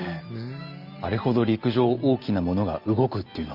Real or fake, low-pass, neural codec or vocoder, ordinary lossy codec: real; 5.4 kHz; none; Opus, 32 kbps